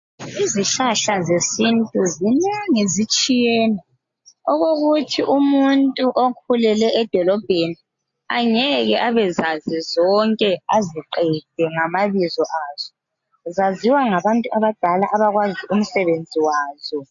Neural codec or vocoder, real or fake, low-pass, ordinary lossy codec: none; real; 7.2 kHz; AAC, 64 kbps